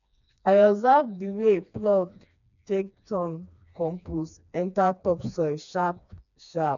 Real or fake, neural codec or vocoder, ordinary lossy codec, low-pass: fake; codec, 16 kHz, 2 kbps, FreqCodec, smaller model; none; 7.2 kHz